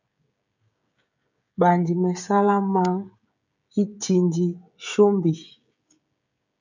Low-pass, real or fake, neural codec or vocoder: 7.2 kHz; fake; codec, 16 kHz, 16 kbps, FreqCodec, smaller model